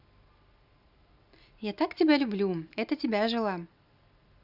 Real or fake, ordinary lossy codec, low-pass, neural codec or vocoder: real; none; 5.4 kHz; none